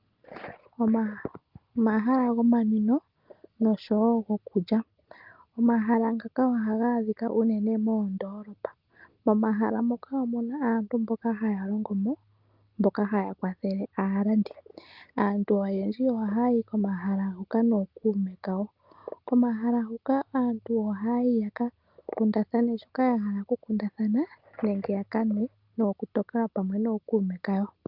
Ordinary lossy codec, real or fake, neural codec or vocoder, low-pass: Opus, 32 kbps; real; none; 5.4 kHz